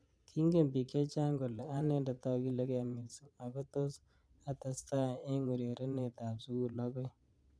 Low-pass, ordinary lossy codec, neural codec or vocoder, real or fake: none; none; vocoder, 22.05 kHz, 80 mel bands, Vocos; fake